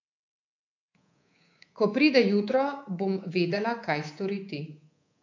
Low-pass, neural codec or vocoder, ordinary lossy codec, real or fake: 7.2 kHz; codec, 24 kHz, 3.1 kbps, DualCodec; AAC, 48 kbps; fake